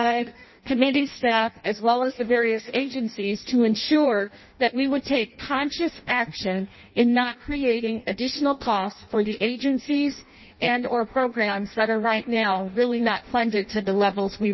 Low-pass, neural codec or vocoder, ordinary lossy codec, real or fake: 7.2 kHz; codec, 16 kHz in and 24 kHz out, 0.6 kbps, FireRedTTS-2 codec; MP3, 24 kbps; fake